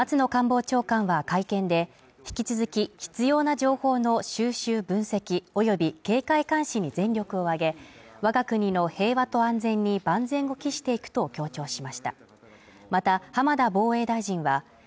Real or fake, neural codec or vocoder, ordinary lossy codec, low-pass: real; none; none; none